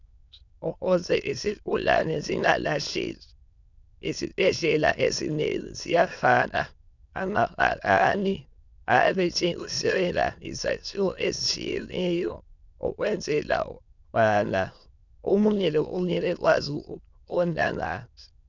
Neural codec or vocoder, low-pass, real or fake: autoencoder, 22.05 kHz, a latent of 192 numbers a frame, VITS, trained on many speakers; 7.2 kHz; fake